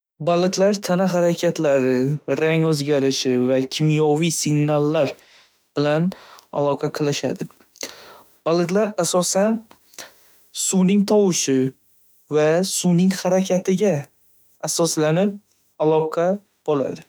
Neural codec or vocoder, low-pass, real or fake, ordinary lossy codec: autoencoder, 48 kHz, 32 numbers a frame, DAC-VAE, trained on Japanese speech; none; fake; none